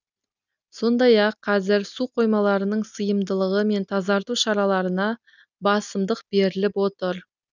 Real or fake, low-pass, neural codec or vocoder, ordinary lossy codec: real; 7.2 kHz; none; none